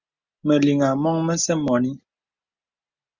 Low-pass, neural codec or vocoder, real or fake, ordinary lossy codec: 7.2 kHz; none; real; Opus, 64 kbps